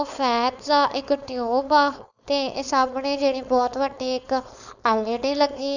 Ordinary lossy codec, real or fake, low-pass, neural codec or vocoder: none; fake; 7.2 kHz; codec, 16 kHz, 4.8 kbps, FACodec